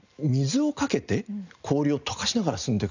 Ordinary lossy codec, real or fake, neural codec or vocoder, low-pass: none; real; none; 7.2 kHz